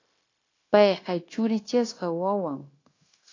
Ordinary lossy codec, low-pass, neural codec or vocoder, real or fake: AAC, 32 kbps; 7.2 kHz; codec, 16 kHz, 0.9 kbps, LongCat-Audio-Codec; fake